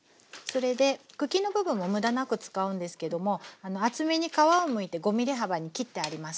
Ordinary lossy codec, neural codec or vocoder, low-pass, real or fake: none; none; none; real